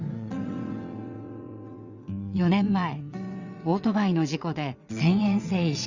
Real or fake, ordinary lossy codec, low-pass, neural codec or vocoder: fake; Opus, 64 kbps; 7.2 kHz; vocoder, 22.05 kHz, 80 mel bands, WaveNeXt